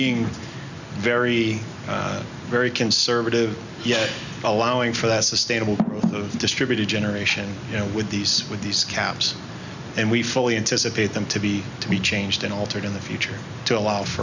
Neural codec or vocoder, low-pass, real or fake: none; 7.2 kHz; real